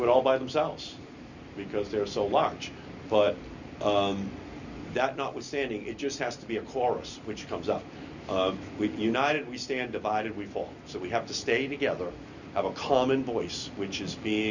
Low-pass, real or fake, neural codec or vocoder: 7.2 kHz; real; none